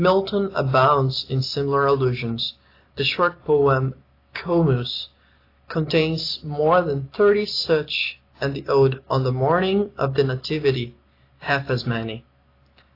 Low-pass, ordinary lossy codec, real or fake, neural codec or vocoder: 5.4 kHz; AAC, 32 kbps; real; none